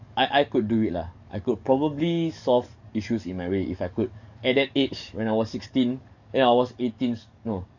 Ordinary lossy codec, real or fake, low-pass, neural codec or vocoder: none; fake; 7.2 kHz; codec, 44.1 kHz, 7.8 kbps, DAC